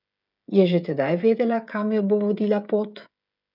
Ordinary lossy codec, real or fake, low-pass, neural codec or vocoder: none; fake; 5.4 kHz; codec, 16 kHz, 16 kbps, FreqCodec, smaller model